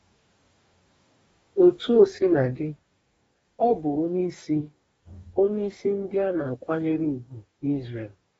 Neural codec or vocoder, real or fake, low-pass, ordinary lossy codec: codec, 44.1 kHz, 2.6 kbps, DAC; fake; 19.8 kHz; AAC, 24 kbps